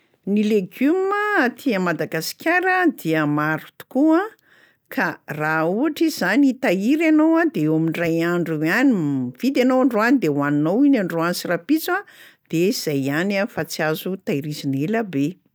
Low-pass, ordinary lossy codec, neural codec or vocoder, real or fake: none; none; none; real